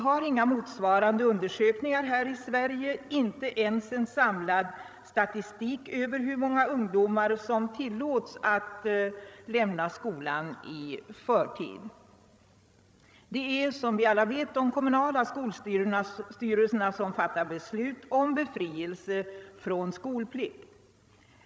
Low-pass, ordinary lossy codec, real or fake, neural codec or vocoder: none; none; fake; codec, 16 kHz, 16 kbps, FreqCodec, larger model